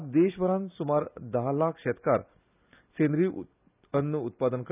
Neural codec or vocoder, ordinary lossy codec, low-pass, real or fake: none; none; 3.6 kHz; real